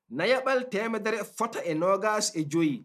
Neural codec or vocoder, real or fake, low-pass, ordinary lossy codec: none; real; 14.4 kHz; none